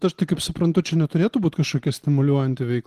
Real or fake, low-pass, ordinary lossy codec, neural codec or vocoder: real; 14.4 kHz; Opus, 16 kbps; none